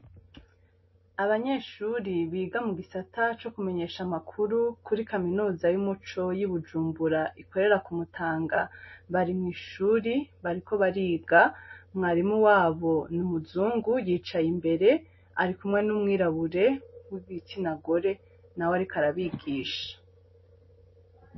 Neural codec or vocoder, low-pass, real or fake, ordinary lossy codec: none; 7.2 kHz; real; MP3, 24 kbps